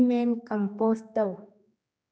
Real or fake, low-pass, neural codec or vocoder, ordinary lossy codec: fake; none; codec, 16 kHz, 2 kbps, X-Codec, HuBERT features, trained on general audio; none